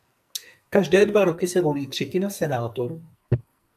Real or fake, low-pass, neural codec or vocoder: fake; 14.4 kHz; codec, 44.1 kHz, 2.6 kbps, SNAC